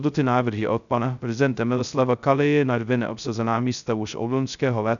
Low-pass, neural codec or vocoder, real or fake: 7.2 kHz; codec, 16 kHz, 0.2 kbps, FocalCodec; fake